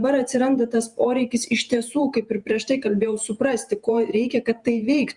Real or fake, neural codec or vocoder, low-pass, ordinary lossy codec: fake; vocoder, 48 kHz, 128 mel bands, Vocos; 10.8 kHz; Opus, 64 kbps